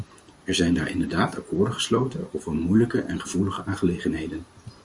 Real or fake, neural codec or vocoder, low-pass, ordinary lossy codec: fake; vocoder, 44.1 kHz, 128 mel bands every 512 samples, BigVGAN v2; 10.8 kHz; Opus, 64 kbps